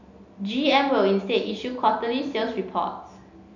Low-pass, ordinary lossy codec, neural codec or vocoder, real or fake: 7.2 kHz; none; none; real